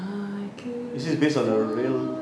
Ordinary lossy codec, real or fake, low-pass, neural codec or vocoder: none; real; none; none